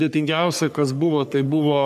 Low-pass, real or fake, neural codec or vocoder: 14.4 kHz; fake; codec, 44.1 kHz, 3.4 kbps, Pupu-Codec